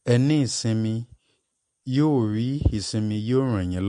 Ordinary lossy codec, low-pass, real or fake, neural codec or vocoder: MP3, 48 kbps; 14.4 kHz; real; none